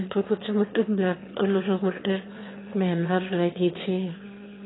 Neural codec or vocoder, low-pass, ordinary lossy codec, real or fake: autoencoder, 22.05 kHz, a latent of 192 numbers a frame, VITS, trained on one speaker; 7.2 kHz; AAC, 16 kbps; fake